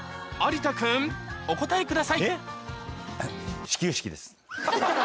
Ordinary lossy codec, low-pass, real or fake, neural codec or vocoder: none; none; real; none